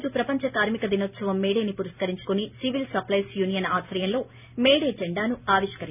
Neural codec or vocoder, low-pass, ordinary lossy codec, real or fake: none; 3.6 kHz; none; real